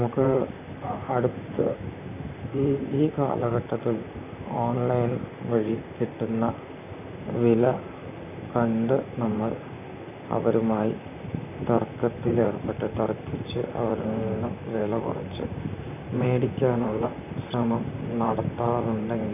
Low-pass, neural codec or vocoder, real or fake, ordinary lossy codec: 3.6 kHz; vocoder, 44.1 kHz, 128 mel bands, Pupu-Vocoder; fake; none